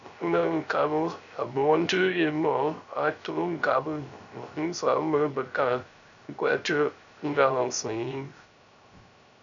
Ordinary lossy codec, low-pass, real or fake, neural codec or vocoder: MP3, 96 kbps; 7.2 kHz; fake; codec, 16 kHz, 0.3 kbps, FocalCodec